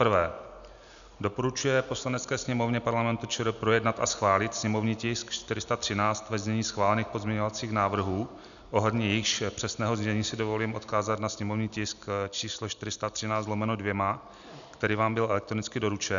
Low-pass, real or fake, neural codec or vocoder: 7.2 kHz; real; none